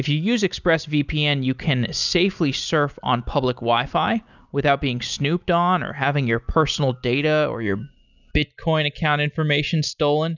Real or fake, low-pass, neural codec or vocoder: real; 7.2 kHz; none